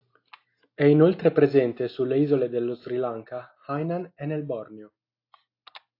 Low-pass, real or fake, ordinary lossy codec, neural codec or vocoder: 5.4 kHz; real; AAC, 32 kbps; none